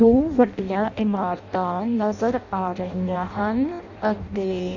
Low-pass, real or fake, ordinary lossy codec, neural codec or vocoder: 7.2 kHz; fake; none; codec, 16 kHz in and 24 kHz out, 0.6 kbps, FireRedTTS-2 codec